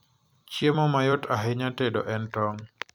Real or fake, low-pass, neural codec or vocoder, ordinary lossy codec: fake; 19.8 kHz; vocoder, 44.1 kHz, 128 mel bands every 256 samples, BigVGAN v2; none